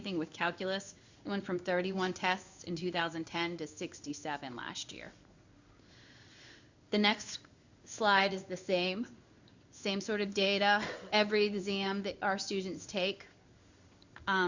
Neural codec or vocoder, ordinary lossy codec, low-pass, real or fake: codec, 16 kHz in and 24 kHz out, 1 kbps, XY-Tokenizer; Opus, 64 kbps; 7.2 kHz; fake